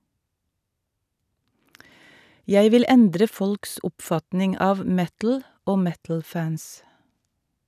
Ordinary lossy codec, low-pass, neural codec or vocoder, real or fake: none; 14.4 kHz; none; real